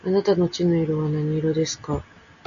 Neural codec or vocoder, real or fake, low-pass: none; real; 7.2 kHz